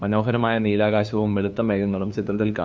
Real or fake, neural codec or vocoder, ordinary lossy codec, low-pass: fake; codec, 16 kHz, 2 kbps, FunCodec, trained on LibriTTS, 25 frames a second; none; none